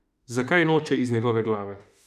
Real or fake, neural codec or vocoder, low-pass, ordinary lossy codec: fake; autoencoder, 48 kHz, 32 numbers a frame, DAC-VAE, trained on Japanese speech; 14.4 kHz; none